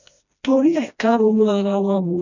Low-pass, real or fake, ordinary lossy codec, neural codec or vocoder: 7.2 kHz; fake; none; codec, 16 kHz, 1 kbps, FreqCodec, smaller model